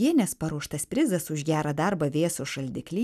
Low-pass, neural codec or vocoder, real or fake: 14.4 kHz; none; real